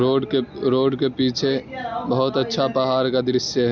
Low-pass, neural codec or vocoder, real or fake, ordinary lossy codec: 7.2 kHz; none; real; none